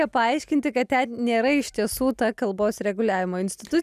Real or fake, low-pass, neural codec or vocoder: real; 14.4 kHz; none